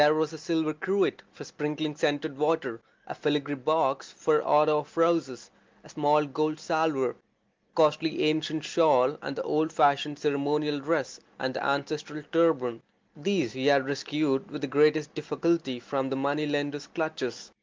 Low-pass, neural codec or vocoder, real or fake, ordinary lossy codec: 7.2 kHz; none; real; Opus, 32 kbps